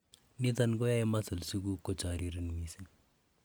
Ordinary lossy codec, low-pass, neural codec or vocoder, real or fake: none; none; none; real